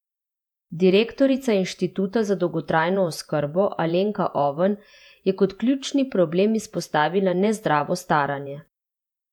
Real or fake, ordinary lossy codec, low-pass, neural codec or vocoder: fake; none; 19.8 kHz; vocoder, 48 kHz, 128 mel bands, Vocos